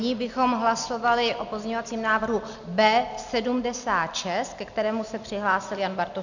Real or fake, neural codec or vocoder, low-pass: real; none; 7.2 kHz